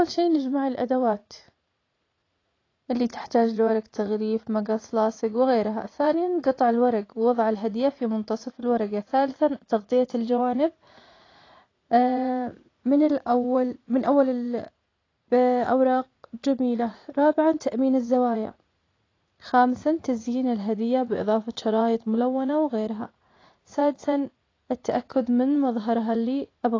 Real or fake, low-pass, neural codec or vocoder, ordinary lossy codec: fake; 7.2 kHz; vocoder, 44.1 kHz, 80 mel bands, Vocos; AAC, 32 kbps